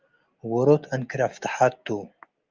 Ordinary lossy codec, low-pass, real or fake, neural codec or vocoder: Opus, 32 kbps; 7.2 kHz; real; none